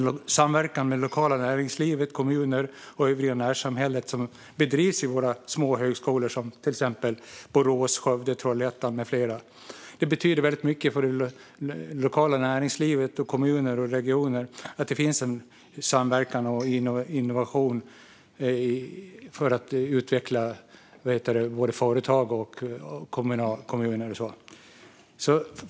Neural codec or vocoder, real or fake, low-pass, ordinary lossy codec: none; real; none; none